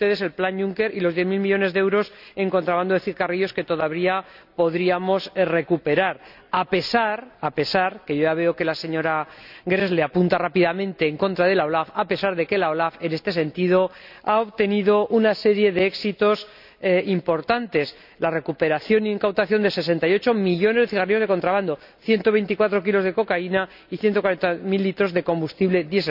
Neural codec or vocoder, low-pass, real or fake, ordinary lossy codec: none; 5.4 kHz; real; none